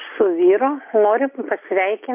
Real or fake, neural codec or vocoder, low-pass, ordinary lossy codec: real; none; 3.6 kHz; MP3, 24 kbps